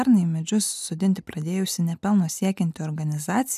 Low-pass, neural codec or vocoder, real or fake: 14.4 kHz; none; real